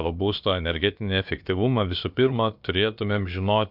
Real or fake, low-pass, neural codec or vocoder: fake; 5.4 kHz; codec, 16 kHz, about 1 kbps, DyCAST, with the encoder's durations